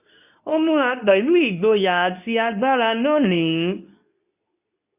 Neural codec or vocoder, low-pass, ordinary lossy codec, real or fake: codec, 24 kHz, 0.9 kbps, WavTokenizer, medium speech release version 2; 3.6 kHz; none; fake